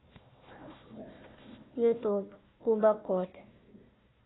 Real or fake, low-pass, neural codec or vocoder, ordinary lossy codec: fake; 7.2 kHz; codec, 16 kHz, 1 kbps, FunCodec, trained on Chinese and English, 50 frames a second; AAC, 16 kbps